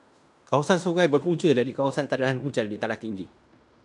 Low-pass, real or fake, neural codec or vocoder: 10.8 kHz; fake; codec, 16 kHz in and 24 kHz out, 0.9 kbps, LongCat-Audio-Codec, fine tuned four codebook decoder